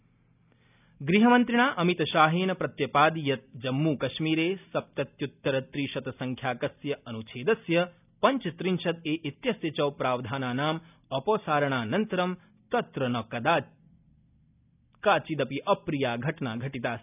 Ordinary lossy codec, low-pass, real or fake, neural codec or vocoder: none; 3.6 kHz; real; none